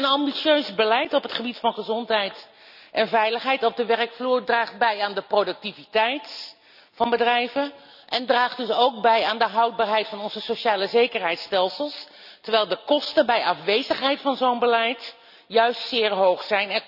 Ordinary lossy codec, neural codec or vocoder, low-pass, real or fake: none; none; 5.4 kHz; real